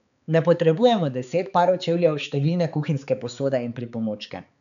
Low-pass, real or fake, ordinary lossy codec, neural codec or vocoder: 7.2 kHz; fake; MP3, 96 kbps; codec, 16 kHz, 4 kbps, X-Codec, HuBERT features, trained on balanced general audio